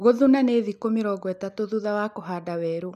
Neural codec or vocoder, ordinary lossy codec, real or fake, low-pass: none; none; real; 14.4 kHz